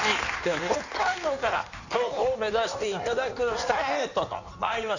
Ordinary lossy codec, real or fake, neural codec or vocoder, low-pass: none; fake; codec, 16 kHz in and 24 kHz out, 1.1 kbps, FireRedTTS-2 codec; 7.2 kHz